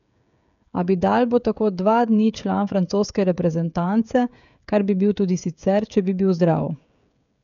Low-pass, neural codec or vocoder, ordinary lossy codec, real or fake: 7.2 kHz; codec, 16 kHz, 16 kbps, FreqCodec, smaller model; none; fake